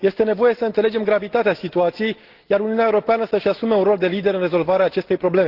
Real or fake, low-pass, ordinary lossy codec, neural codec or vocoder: real; 5.4 kHz; Opus, 16 kbps; none